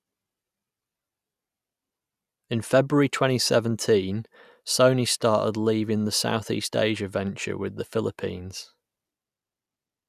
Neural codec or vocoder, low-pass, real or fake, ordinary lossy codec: none; 14.4 kHz; real; none